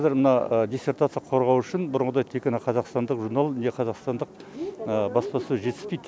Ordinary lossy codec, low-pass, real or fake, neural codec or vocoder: none; none; real; none